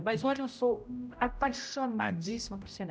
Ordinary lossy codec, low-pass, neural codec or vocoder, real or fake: none; none; codec, 16 kHz, 0.5 kbps, X-Codec, HuBERT features, trained on general audio; fake